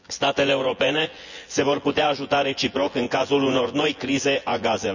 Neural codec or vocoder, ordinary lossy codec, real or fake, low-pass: vocoder, 24 kHz, 100 mel bands, Vocos; none; fake; 7.2 kHz